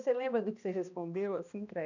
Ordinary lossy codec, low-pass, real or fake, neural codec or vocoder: none; 7.2 kHz; fake; codec, 16 kHz, 1 kbps, X-Codec, HuBERT features, trained on balanced general audio